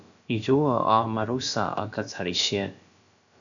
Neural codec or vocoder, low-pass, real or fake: codec, 16 kHz, about 1 kbps, DyCAST, with the encoder's durations; 7.2 kHz; fake